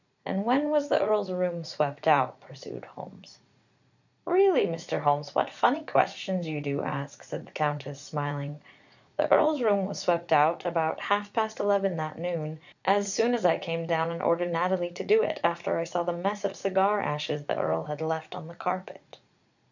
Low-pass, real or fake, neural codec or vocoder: 7.2 kHz; fake; vocoder, 44.1 kHz, 80 mel bands, Vocos